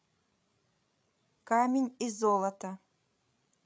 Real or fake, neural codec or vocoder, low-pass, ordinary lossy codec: fake; codec, 16 kHz, 16 kbps, FreqCodec, larger model; none; none